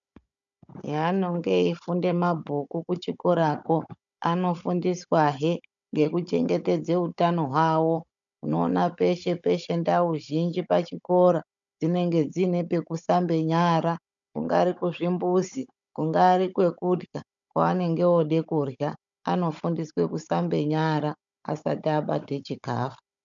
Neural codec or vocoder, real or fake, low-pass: codec, 16 kHz, 16 kbps, FunCodec, trained on Chinese and English, 50 frames a second; fake; 7.2 kHz